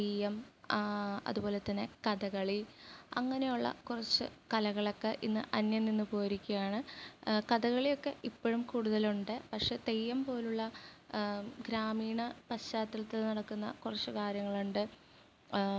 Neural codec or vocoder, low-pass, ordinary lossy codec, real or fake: none; none; none; real